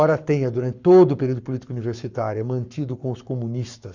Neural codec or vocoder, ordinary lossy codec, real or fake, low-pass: codec, 44.1 kHz, 7.8 kbps, Pupu-Codec; Opus, 64 kbps; fake; 7.2 kHz